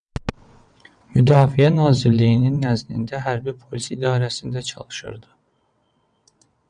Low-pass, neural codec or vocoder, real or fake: 9.9 kHz; vocoder, 22.05 kHz, 80 mel bands, WaveNeXt; fake